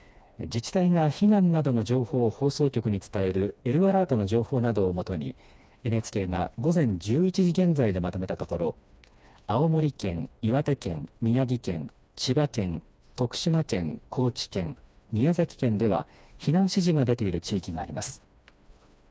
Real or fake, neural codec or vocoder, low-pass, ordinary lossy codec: fake; codec, 16 kHz, 2 kbps, FreqCodec, smaller model; none; none